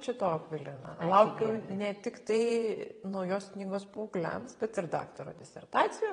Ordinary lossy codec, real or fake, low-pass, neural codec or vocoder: AAC, 32 kbps; fake; 9.9 kHz; vocoder, 22.05 kHz, 80 mel bands, WaveNeXt